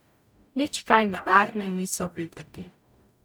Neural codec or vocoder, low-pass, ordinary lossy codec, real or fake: codec, 44.1 kHz, 0.9 kbps, DAC; none; none; fake